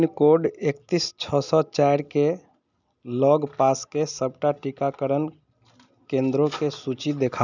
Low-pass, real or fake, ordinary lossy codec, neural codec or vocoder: 7.2 kHz; real; none; none